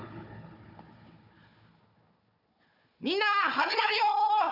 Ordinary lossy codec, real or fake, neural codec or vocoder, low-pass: none; fake; codec, 16 kHz, 4 kbps, FunCodec, trained on Chinese and English, 50 frames a second; 5.4 kHz